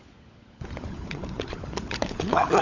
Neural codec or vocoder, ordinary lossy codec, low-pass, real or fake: codec, 16 kHz, 16 kbps, FunCodec, trained on LibriTTS, 50 frames a second; Opus, 64 kbps; 7.2 kHz; fake